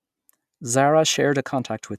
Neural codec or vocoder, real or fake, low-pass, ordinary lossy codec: none; real; 14.4 kHz; none